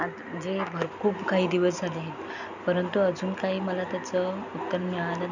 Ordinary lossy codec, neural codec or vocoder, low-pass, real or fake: none; none; 7.2 kHz; real